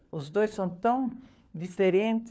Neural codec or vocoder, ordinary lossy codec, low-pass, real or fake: codec, 16 kHz, 4 kbps, FunCodec, trained on LibriTTS, 50 frames a second; none; none; fake